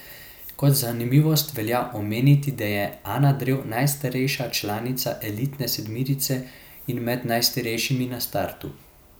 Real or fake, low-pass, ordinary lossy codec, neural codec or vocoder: real; none; none; none